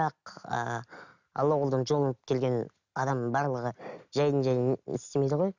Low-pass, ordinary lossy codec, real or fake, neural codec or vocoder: 7.2 kHz; none; real; none